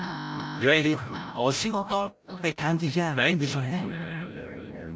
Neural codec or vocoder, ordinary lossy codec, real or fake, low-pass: codec, 16 kHz, 0.5 kbps, FreqCodec, larger model; none; fake; none